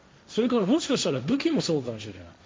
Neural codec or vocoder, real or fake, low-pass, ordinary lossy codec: codec, 16 kHz, 1.1 kbps, Voila-Tokenizer; fake; none; none